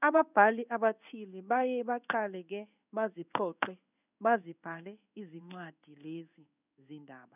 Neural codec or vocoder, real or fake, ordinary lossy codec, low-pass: codec, 16 kHz in and 24 kHz out, 1 kbps, XY-Tokenizer; fake; none; 3.6 kHz